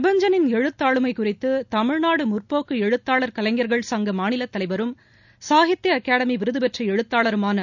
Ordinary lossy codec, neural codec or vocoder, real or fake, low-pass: none; none; real; 7.2 kHz